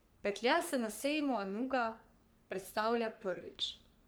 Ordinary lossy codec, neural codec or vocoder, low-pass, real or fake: none; codec, 44.1 kHz, 3.4 kbps, Pupu-Codec; none; fake